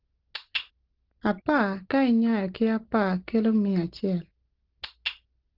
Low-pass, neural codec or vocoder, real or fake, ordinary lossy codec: 5.4 kHz; none; real; Opus, 16 kbps